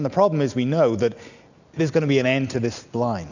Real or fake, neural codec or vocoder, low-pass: real; none; 7.2 kHz